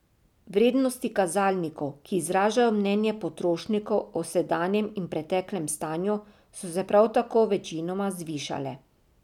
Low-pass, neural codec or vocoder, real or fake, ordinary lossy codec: 19.8 kHz; none; real; none